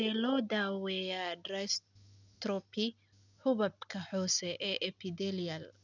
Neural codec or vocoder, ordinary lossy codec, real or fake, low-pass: none; none; real; 7.2 kHz